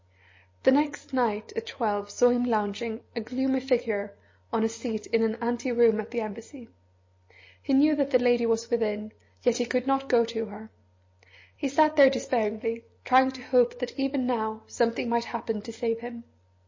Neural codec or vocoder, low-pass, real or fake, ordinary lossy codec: none; 7.2 kHz; real; MP3, 32 kbps